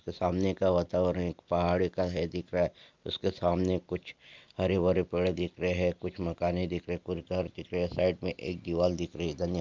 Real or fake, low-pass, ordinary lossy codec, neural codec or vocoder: real; 7.2 kHz; Opus, 24 kbps; none